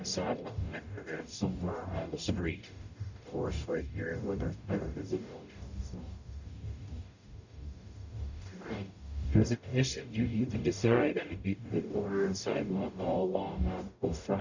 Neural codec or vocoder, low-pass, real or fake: codec, 44.1 kHz, 0.9 kbps, DAC; 7.2 kHz; fake